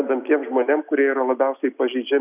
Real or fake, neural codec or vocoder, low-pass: real; none; 3.6 kHz